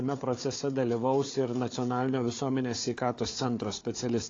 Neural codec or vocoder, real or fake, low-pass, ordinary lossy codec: codec, 16 kHz, 4 kbps, FunCodec, trained on Chinese and English, 50 frames a second; fake; 7.2 kHz; AAC, 32 kbps